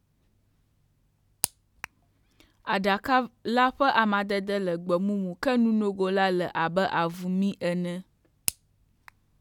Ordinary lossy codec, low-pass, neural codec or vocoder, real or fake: none; 19.8 kHz; none; real